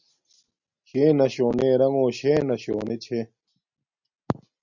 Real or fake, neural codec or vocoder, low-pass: real; none; 7.2 kHz